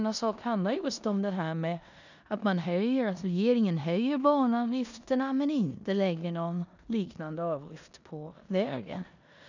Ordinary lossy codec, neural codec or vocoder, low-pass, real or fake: none; codec, 16 kHz in and 24 kHz out, 0.9 kbps, LongCat-Audio-Codec, four codebook decoder; 7.2 kHz; fake